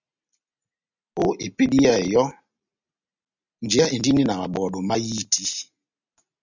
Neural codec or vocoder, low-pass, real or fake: none; 7.2 kHz; real